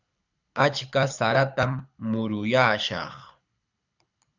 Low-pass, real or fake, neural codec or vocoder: 7.2 kHz; fake; codec, 24 kHz, 6 kbps, HILCodec